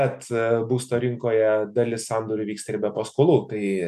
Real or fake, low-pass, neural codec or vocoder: real; 14.4 kHz; none